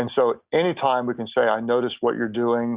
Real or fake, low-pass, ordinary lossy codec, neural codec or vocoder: real; 3.6 kHz; Opus, 64 kbps; none